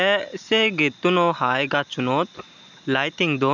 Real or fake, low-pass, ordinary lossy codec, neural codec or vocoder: real; 7.2 kHz; none; none